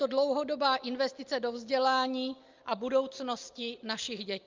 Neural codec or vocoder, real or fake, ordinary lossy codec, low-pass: none; real; Opus, 24 kbps; 7.2 kHz